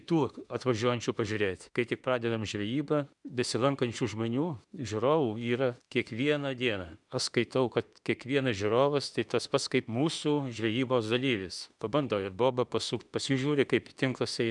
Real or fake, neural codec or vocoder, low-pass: fake; autoencoder, 48 kHz, 32 numbers a frame, DAC-VAE, trained on Japanese speech; 10.8 kHz